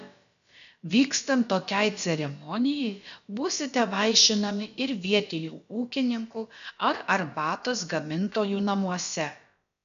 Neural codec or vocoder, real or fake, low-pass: codec, 16 kHz, about 1 kbps, DyCAST, with the encoder's durations; fake; 7.2 kHz